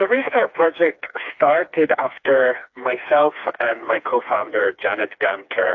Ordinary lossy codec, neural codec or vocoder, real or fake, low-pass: AAC, 48 kbps; codec, 16 kHz, 2 kbps, FreqCodec, smaller model; fake; 7.2 kHz